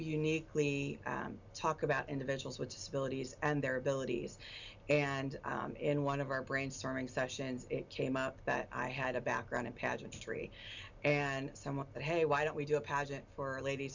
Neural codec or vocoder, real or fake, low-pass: none; real; 7.2 kHz